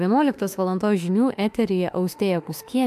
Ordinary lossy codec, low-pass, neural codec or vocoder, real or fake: AAC, 96 kbps; 14.4 kHz; autoencoder, 48 kHz, 32 numbers a frame, DAC-VAE, trained on Japanese speech; fake